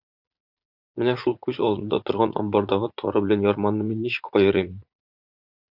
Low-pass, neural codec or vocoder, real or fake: 5.4 kHz; vocoder, 44.1 kHz, 80 mel bands, Vocos; fake